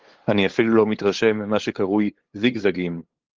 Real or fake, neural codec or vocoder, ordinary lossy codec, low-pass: fake; codec, 16 kHz, 8 kbps, FunCodec, trained on LibriTTS, 25 frames a second; Opus, 16 kbps; 7.2 kHz